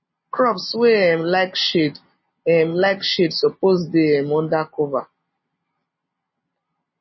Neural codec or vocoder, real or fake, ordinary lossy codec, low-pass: none; real; MP3, 24 kbps; 7.2 kHz